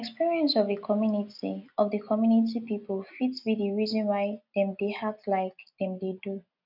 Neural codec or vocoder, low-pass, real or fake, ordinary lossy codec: none; 5.4 kHz; real; none